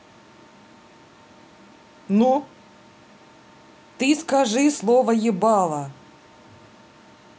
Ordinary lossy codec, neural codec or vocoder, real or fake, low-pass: none; none; real; none